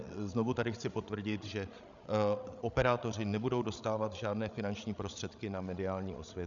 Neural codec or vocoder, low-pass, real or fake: codec, 16 kHz, 16 kbps, FreqCodec, larger model; 7.2 kHz; fake